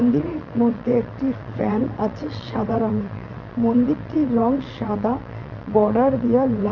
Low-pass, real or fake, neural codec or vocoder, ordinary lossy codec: 7.2 kHz; fake; vocoder, 22.05 kHz, 80 mel bands, WaveNeXt; none